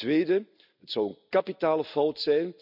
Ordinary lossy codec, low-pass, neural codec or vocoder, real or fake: none; 5.4 kHz; none; real